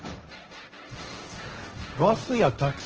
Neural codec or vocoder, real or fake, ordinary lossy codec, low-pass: codec, 16 kHz, 1.1 kbps, Voila-Tokenizer; fake; Opus, 16 kbps; 7.2 kHz